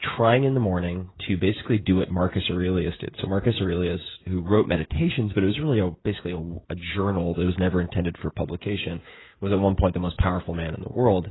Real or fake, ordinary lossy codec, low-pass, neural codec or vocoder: fake; AAC, 16 kbps; 7.2 kHz; codec, 16 kHz, 16 kbps, FreqCodec, smaller model